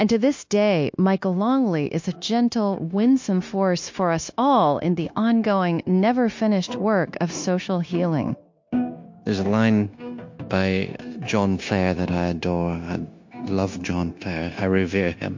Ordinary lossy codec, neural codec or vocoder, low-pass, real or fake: MP3, 48 kbps; codec, 16 kHz, 0.9 kbps, LongCat-Audio-Codec; 7.2 kHz; fake